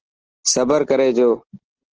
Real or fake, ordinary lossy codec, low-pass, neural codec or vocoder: real; Opus, 16 kbps; 7.2 kHz; none